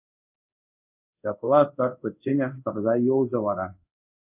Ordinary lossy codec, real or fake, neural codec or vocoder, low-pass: AAC, 32 kbps; fake; codec, 24 kHz, 0.5 kbps, DualCodec; 3.6 kHz